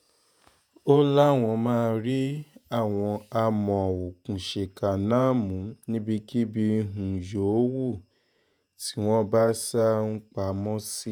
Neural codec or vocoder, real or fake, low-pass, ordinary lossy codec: vocoder, 48 kHz, 128 mel bands, Vocos; fake; none; none